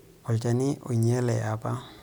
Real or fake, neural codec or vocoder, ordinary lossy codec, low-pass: real; none; none; none